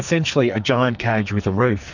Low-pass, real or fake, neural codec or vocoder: 7.2 kHz; fake; codec, 44.1 kHz, 2.6 kbps, SNAC